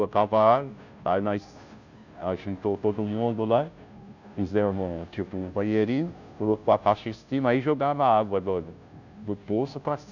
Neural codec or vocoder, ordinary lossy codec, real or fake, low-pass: codec, 16 kHz, 0.5 kbps, FunCodec, trained on Chinese and English, 25 frames a second; none; fake; 7.2 kHz